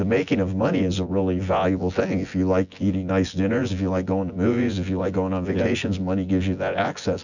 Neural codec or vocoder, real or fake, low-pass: vocoder, 24 kHz, 100 mel bands, Vocos; fake; 7.2 kHz